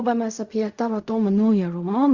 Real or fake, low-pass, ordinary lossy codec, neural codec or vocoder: fake; 7.2 kHz; Opus, 64 kbps; codec, 16 kHz in and 24 kHz out, 0.4 kbps, LongCat-Audio-Codec, fine tuned four codebook decoder